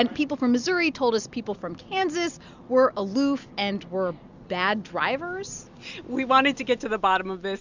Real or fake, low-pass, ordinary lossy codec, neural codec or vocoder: real; 7.2 kHz; Opus, 64 kbps; none